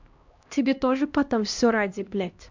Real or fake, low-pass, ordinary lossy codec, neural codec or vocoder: fake; 7.2 kHz; MP3, 64 kbps; codec, 16 kHz, 1 kbps, X-Codec, HuBERT features, trained on LibriSpeech